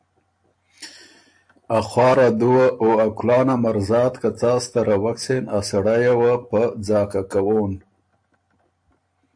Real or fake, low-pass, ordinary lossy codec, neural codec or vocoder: real; 9.9 kHz; AAC, 64 kbps; none